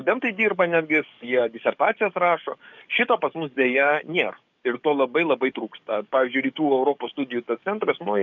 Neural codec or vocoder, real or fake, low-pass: codec, 44.1 kHz, 7.8 kbps, DAC; fake; 7.2 kHz